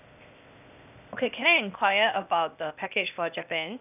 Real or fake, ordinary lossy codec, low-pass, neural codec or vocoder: fake; none; 3.6 kHz; codec, 16 kHz, 0.8 kbps, ZipCodec